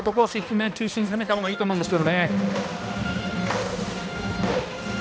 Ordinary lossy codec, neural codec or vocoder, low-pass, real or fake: none; codec, 16 kHz, 1 kbps, X-Codec, HuBERT features, trained on balanced general audio; none; fake